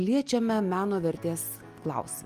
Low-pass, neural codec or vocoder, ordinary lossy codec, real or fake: 14.4 kHz; none; Opus, 32 kbps; real